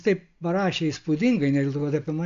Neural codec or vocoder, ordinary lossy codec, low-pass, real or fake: none; AAC, 64 kbps; 7.2 kHz; real